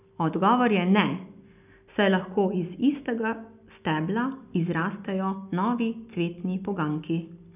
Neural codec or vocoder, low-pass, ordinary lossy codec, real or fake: none; 3.6 kHz; none; real